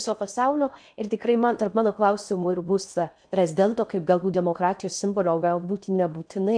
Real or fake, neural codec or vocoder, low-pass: fake; codec, 16 kHz in and 24 kHz out, 0.6 kbps, FocalCodec, streaming, 4096 codes; 9.9 kHz